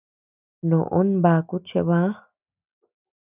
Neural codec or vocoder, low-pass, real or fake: none; 3.6 kHz; real